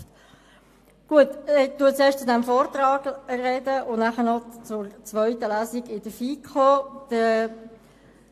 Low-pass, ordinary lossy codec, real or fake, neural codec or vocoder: 14.4 kHz; AAC, 64 kbps; real; none